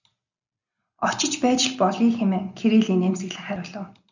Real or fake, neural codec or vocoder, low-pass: real; none; 7.2 kHz